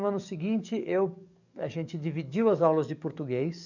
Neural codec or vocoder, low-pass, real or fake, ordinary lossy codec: codec, 44.1 kHz, 7.8 kbps, DAC; 7.2 kHz; fake; none